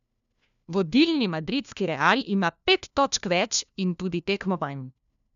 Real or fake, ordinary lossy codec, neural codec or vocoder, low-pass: fake; none; codec, 16 kHz, 1 kbps, FunCodec, trained on LibriTTS, 50 frames a second; 7.2 kHz